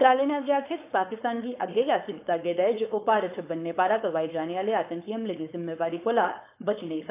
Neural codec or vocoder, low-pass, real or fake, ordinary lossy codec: codec, 16 kHz, 4.8 kbps, FACodec; 3.6 kHz; fake; AAC, 24 kbps